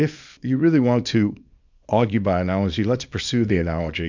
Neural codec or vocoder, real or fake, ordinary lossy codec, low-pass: codec, 24 kHz, 0.9 kbps, WavTokenizer, small release; fake; MP3, 64 kbps; 7.2 kHz